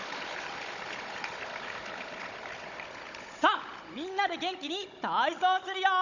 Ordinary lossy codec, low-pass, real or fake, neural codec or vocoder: none; 7.2 kHz; fake; codec, 16 kHz, 16 kbps, FunCodec, trained on Chinese and English, 50 frames a second